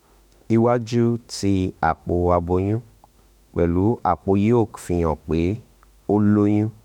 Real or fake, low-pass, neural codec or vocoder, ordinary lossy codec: fake; 19.8 kHz; autoencoder, 48 kHz, 32 numbers a frame, DAC-VAE, trained on Japanese speech; none